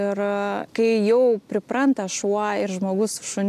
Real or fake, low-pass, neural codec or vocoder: real; 14.4 kHz; none